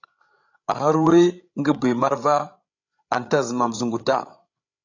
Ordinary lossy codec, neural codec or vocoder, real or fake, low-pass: AAC, 48 kbps; codec, 16 kHz, 16 kbps, FreqCodec, larger model; fake; 7.2 kHz